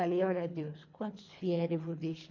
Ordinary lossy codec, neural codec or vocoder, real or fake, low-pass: MP3, 48 kbps; codec, 24 kHz, 3 kbps, HILCodec; fake; 7.2 kHz